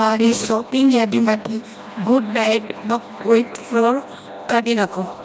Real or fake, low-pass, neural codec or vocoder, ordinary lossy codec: fake; none; codec, 16 kHz, 1 kbps, FreqCodec, smaller model; none